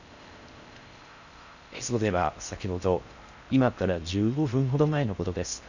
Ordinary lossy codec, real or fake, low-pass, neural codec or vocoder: none; fake; 7.2 kHz; codec, 16 kHz in and 24 kHz out, 0.6 kbps, FocalCodec, streaming, 4096 codes